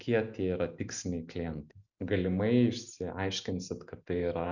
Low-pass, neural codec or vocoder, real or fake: 7.2 kHz; none; real